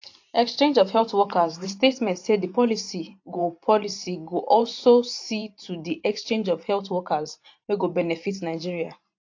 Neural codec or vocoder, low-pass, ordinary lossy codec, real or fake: vocoder, 44.1 kHz, 128 mel bands every 512 samples, BigVGAN v2; 7.2 kHz; AAC, 48 kbps; fake